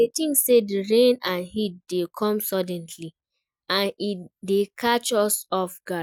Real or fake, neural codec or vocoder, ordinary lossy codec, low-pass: real; none; none; 19.8 kHz